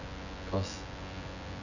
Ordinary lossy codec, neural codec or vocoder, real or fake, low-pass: none; vocoder, 24 kHz, 100 mel bands, Vocos; fake; 7.2 kHz